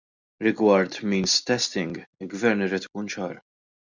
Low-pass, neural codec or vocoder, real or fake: 7.2 kHz; none; real